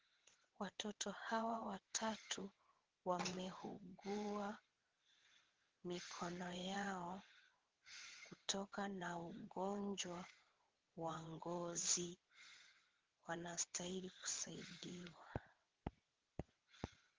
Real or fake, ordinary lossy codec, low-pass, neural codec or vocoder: fake; Opus, 16 kbps; 7.2 kHz; vocoder, 22.05 kHz, 80 mel bands, WaveNeXt